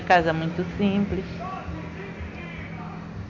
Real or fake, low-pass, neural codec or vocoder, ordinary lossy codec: real; 7.2 kHz; none; none